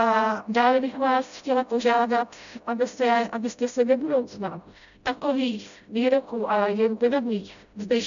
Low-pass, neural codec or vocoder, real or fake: 7.2 kHz; codec, 16 kHz, 0.5 kbps, FreqCodec, smaller model; fake